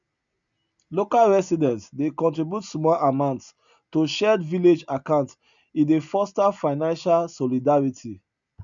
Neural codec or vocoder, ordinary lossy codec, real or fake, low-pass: none; none; real; 7.2 kHz